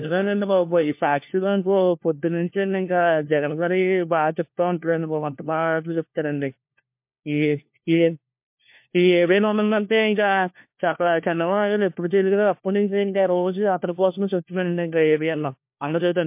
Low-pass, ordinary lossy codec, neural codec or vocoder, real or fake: 3.6 kHz; MP3, 32 kbps; codec, 16 kHz, 1 kbps, FunCodec, trained on LibriTTS, 50 frames a second; fake